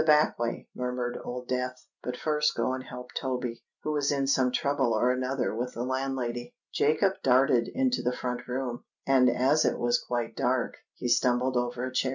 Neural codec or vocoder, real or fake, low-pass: none; real; 7.2 kHz